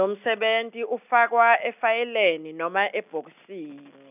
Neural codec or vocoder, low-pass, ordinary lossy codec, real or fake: none; 3.6 kHz; none; real